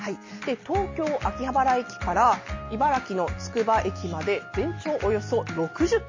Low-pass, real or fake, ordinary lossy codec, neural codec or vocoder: 7.2 kHz; real; MP3, 32 kbps; none